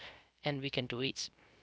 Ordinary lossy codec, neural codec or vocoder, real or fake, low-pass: none; codec, 16 kHz, 0.3 kbps, FocalCodec; fake; none